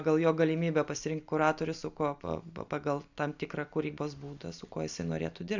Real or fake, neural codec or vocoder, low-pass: real; none; 7.2 kHz